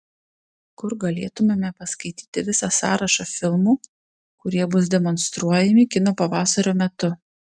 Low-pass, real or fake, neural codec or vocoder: 9.9 kHz; real; none